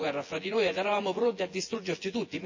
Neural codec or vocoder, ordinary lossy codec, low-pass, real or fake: vocoder, 24 kHz, 100 mel bands, Vocos; MP3, 32 kbps; 7.2 kHz; fake